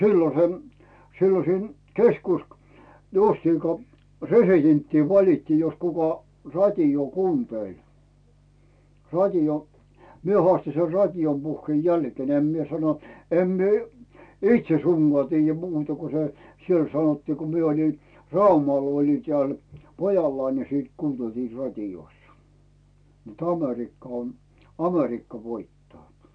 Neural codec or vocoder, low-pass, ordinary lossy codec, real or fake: none; 9.9 kHz; none; real